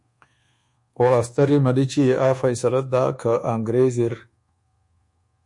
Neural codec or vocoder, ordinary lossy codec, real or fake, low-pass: codec, 24 kHz, 1.2 kbps, DualCodec; MP3, 48 kbps; fake; 10.8 kHz